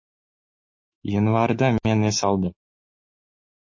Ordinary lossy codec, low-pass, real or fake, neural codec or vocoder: MP3, 32 kbps; 7.2 kHz; fake; autoencoder, 48 kHz, 128 numbers a frame, DAC-VAE, trained on Japanese speech